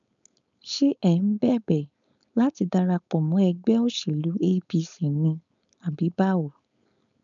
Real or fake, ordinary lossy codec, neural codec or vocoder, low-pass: fake; none; codec, 16 kHz, 4.8 kbps, FACodec; 7.2 kHz